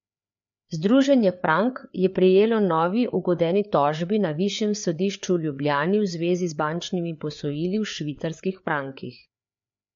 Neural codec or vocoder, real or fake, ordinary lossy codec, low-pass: codec, 16 kHz, 4 kbps, FreqCodec, larger model; fake; MP3, 48 kbps; 7.2 kHz